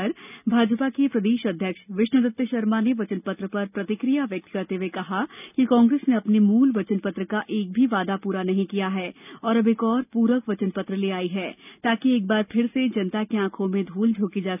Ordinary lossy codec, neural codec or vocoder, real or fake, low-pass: none; none; real; 3.6 kHz